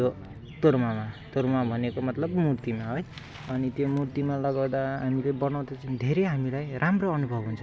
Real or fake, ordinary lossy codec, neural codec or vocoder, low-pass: real; none; none; none